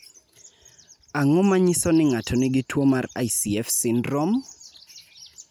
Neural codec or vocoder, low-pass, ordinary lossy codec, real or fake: none; none; none; real